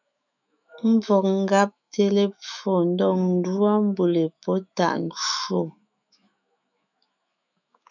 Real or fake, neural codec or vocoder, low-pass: fake; autoencoder, 48 kHz, 128 numbers a frame, DAC-VAE, trained on Japanese speech; 7.2 kHz